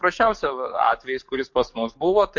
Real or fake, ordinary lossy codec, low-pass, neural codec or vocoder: fake; MP3, 48 kbps; 7.2 kHz; codec, 44.1 kHz, 7.8 kbps, DAC